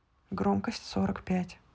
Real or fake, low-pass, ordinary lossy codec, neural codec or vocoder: real; none; none; none